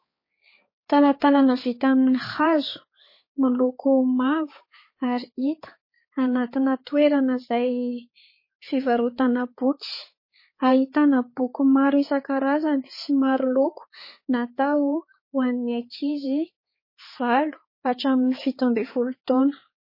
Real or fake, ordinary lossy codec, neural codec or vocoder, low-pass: fake; MP3, 24 kbps; codec, 16 kHz, 4 kbps, X-Codec, HuBERT features, trained on balanced general audio; 5.4 kHz